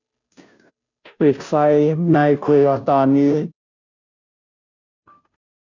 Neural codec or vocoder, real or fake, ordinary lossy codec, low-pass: codec, 16 kHz, 0.5 kbps, FunCodec, trained on Chinese and English, 25 frames a second; fake; none; 7.2 kHz